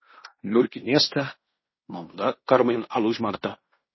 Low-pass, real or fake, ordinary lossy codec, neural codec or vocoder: 7.2 kHz; fake; MP3, 24 kbps; codec, 16 kHz in and 24 kHz out, 0.9 kbps, LongCat-Audio-Codec, fine tuned four codebook decoder